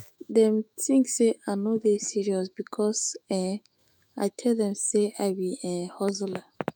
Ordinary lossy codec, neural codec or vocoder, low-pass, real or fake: none; autoencoder, 48 kHz, 128 numbers a frame, DAC-VAE, trained on Japanese speech; none; fake